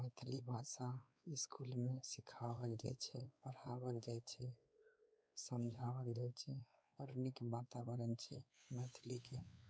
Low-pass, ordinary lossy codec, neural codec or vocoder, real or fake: none; none; codec, 16 kHz, 4 kbps, X-Codec, WavLM features, trained on Multilingual LibriSpeech; fake